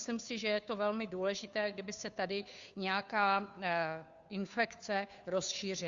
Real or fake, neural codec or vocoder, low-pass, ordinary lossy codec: fake; codec, 16 kHz, 2 kbps, FunCodec, trained on Chinese and English, 25 frames a second; 7.2 kHz; Opus, 64 kbps